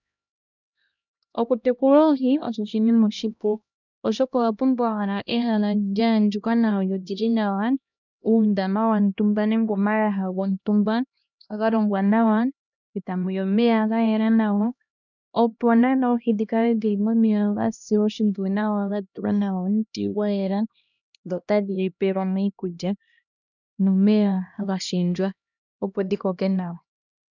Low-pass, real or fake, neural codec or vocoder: 7.2 kHz; fake; codec, 16 kHz, 1 kbps, X-Codec, HuBERT features, trained on LibriSpeech